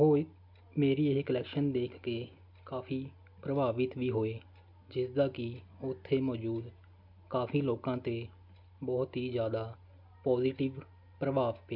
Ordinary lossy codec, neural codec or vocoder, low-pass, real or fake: none; none; 5.4 kHz; real